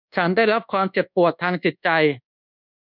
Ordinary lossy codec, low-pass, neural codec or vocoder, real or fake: none; 5.4 kHz; codec, 24 kHz, 1.2 kbps, DualCodec; fake